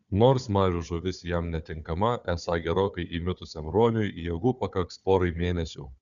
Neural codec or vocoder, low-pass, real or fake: codec, 16 kHz, 4 kbps, FunCodec, trained on Chinese and English, 50 frames a second; 7.2 kHz; fake